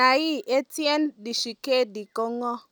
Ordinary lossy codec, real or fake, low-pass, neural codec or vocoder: none; real; none; none